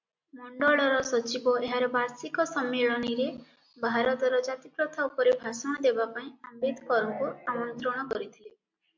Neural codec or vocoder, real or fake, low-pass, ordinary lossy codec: none; real; 7.2 kHz; MP3, 64 kbps